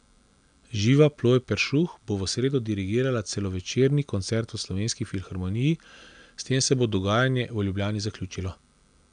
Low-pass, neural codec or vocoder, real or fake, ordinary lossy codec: 9.9 kHz; none; real; none